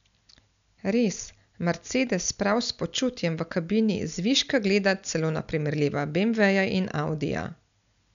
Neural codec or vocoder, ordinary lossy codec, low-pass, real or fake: none; none; 7.2 kHz; real